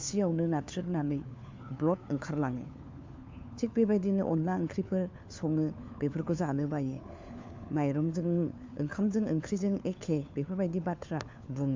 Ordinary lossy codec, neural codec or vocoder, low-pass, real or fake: MP3, 64 kbps; codec, 16 kHz, 4 kbps, FunCodec, trained on LibriTTS, 50 frames a second; 7.2 kHz; fake